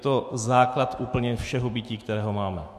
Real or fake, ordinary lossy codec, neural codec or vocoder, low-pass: fake; MP3, 64 kbps; autoencoder, 48 kHz, 128 numbers a frame, DAC-VAE, trained on Japanese speech; 14.4 kHz